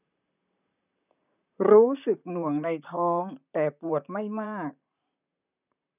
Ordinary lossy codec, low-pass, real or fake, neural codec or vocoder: none; 3.6 kHz; fake; vocoder, 44.1 kHz, 128 mel bands, Pupu-Vocoder